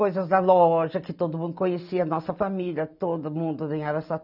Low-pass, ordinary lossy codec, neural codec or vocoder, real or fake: 5.4 kHz; none; none; real